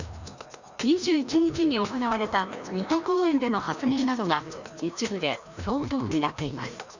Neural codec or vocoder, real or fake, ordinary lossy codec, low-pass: codec, 16 kHz, 1 kbps, FreqCodec, larger model; fake; none; 7.2 kHz